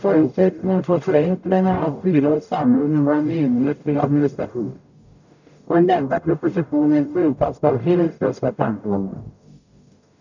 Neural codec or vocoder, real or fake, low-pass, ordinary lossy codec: codec, 44.1 kHz, 0.9 kbps, DAC; fake; 7.2 kHz; none